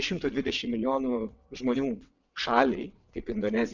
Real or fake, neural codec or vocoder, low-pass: fake; vocoder, 22.05 kHz, 80 mel bands, WaveNeXt; 7.2 kHz